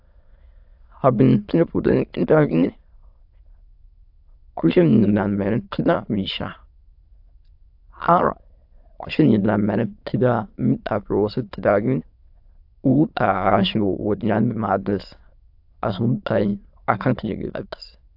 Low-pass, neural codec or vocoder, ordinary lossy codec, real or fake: 5.4 kHz; autoencoder, 22.05 kHz, a latent of 192 numbers a frame, VITS, trained on many speakers; AAC, 48 kbps; fake